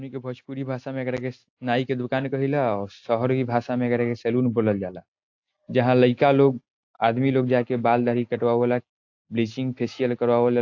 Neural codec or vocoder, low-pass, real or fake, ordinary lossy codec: none; 7.2 kHz; real; AAC, 48 kbps